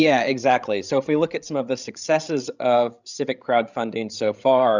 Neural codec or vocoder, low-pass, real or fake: codec, 16 kHz, 16 kbps, FreqCodec, larger model; 7.2 kHz; fake